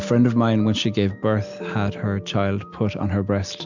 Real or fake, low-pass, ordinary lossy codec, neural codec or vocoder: real; 7.2 kHz; MP3, 64 kbps; none